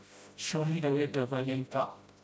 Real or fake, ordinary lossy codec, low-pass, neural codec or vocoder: fake; none; none; codec, 16 kHz, 0.5 kbps, FreqCodec, smaller model